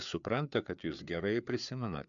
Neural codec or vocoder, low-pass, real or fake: codec, 16 kHz, 4 kbps, FreqCodec, larger model; 7.2 kHz; fake